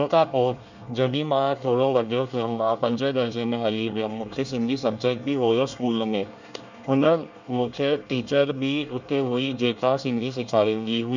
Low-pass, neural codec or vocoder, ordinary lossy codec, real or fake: 7.2 kHz; codec, 24 kHz, 1 kbps, SNAC; none; fake